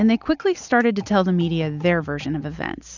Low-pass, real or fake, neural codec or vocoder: 7.2 kHz; real; none